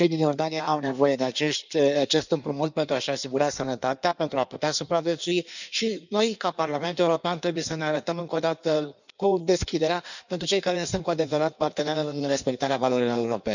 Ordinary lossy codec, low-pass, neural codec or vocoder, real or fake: none; 7.2 kHz; codec, 16 kHz in and 24 kHz out, 1.1 kbps, FireRedTTS-2 codec; fake